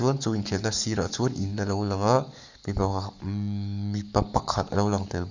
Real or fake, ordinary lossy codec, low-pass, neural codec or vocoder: fake; none; 7.2 kHz; codec, 44.1 kHz, 7.8 kbps, Pupu-Codec